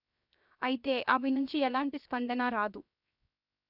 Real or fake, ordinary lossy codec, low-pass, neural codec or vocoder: fake; none; 5.4 kHz; codec, 16 kHz, 0.7 kbps, FocalCodec